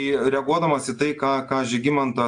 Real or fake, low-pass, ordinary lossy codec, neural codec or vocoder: real; 9.9 kHz; AAC, 64 kbps; none